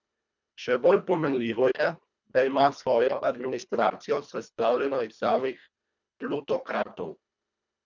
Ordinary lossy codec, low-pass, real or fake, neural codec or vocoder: none; 7.2 kHz; fake; codec, 24 kHz, 1.5 kbps, HILCodec